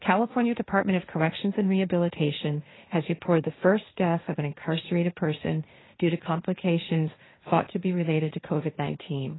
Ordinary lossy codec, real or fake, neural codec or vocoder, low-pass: AAC, 16 kbps; fake; codec, 16 kHz, 1.1 kbps, Voila-Tokenizer; 7.2 kHz